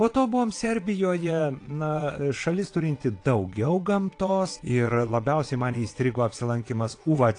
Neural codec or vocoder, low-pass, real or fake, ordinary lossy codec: vocoder, 22.05 kHz, 80 mel bands, Vocos; 9.9 kHz; fake; AAC, 48 kbps